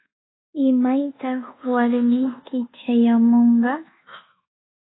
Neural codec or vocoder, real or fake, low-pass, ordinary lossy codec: codec, 24 kHz, 1.2 kbps, DualCodec; fake; 7.2 kHz; AAC, 16 kbps